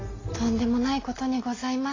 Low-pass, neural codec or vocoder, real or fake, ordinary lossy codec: 7.2 kHz; none; real; AAC, 32 kbps